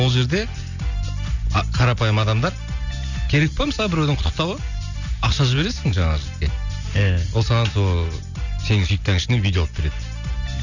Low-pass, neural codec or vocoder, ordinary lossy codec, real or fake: 7.2 kHz; none; none; real